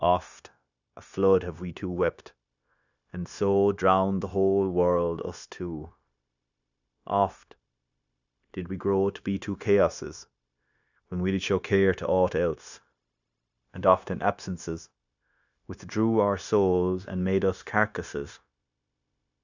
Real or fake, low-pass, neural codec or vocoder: fake; 7.2 kHz; codec, 16 kHz, 0.9 kbps, LongCat-Audio-Codec